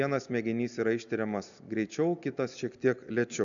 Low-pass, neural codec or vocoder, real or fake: 7.2 kHz; none; real